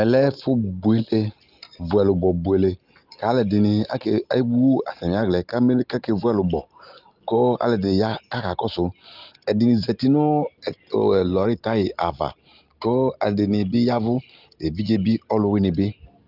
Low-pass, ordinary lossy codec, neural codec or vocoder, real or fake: 5.4 kHz; Opus, 32 kbps; none; real